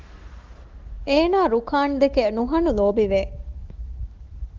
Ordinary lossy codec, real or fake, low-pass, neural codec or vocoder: Opus, 16 kbps; fake; 7.2 kHz; autoencoder, 48 kHz, 128 numbers a frame, DAC-VAE, trained on Japanese speech